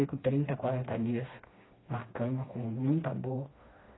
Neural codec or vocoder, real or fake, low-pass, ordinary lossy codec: codec, 16 kHz, 2 kbps, FreqCodec, smaller model; fake; 7.2 kHz; AAC, 16 kbps